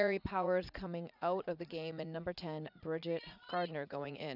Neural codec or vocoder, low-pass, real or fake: vocoder, 44.1 kHz, 80 mel bands, Vocos; 5.4 kHz; fake